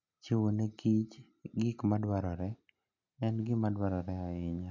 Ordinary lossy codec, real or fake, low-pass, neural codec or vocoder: MP3, 48 kbps; real; 7.2 kHz; none